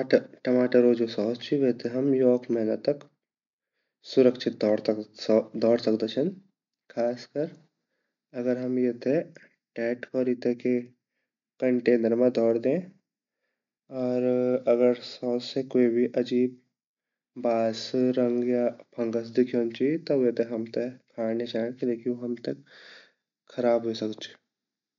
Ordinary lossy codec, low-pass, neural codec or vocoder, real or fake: MP3, 64 kbps; 7.2 kHz; none; real